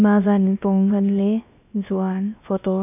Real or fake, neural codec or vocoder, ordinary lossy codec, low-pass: fake; codec, 16 kHz, about 1 kbps, DyCAST, with the encoder's durations; none; 3.6 kHz